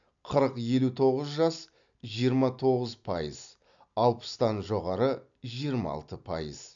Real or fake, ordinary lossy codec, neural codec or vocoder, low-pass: real; none; none; 7.2 kHz